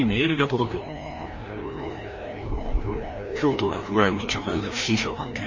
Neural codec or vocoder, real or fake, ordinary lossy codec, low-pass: codec, 16 kHz, 1 kbps, FreqCodec, larger model; fake; MP3, 32 kbps; 7.2 kHz